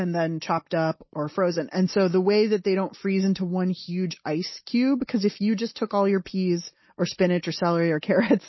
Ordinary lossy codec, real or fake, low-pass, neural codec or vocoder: MP3, 24 kbps; real; 7.2 kHz; none